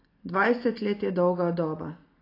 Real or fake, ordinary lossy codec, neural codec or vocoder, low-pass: real; AAC, 24 kbps; none; 5.4 kHz